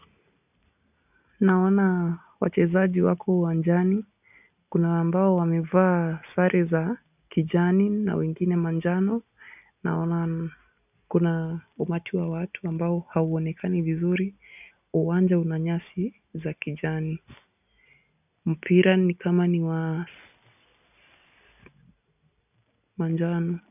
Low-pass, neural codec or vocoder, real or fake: 3.6 kHz; none; real